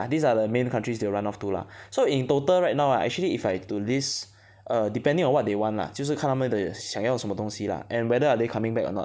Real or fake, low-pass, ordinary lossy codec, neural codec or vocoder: real; none; none; none